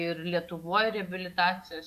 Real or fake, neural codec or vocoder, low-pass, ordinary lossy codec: fake; autoencoder, 48 kHz, 128 numbers a frame, DAC-VAE, trained on Japanese speech; 14.4 kHz; MP3, 96 kbps